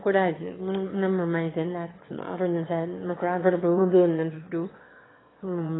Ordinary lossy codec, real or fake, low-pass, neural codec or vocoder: AAC, 16 kbps; fake; 7.2 kHz; autoencoder, 22.05 kHz, a latent of 192 numbers a frame, VITS, trained on one speaker